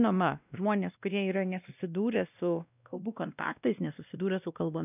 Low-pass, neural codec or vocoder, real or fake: 3.6 kHz; codec, 16 kHz, 1 kbps, X-Codec, WavLM features, trained on Multilingual LibriSpeech; fake